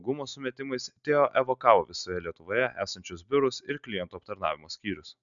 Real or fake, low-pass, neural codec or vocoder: real; 7.2 kHz; none